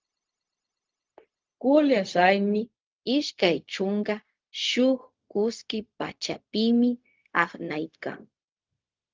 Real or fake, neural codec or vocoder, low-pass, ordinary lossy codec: fake; codec, 16 kHz, 0.4 kbps, LongCat-Audio-Codec; 7.2 kHz; Opus, 32 kbps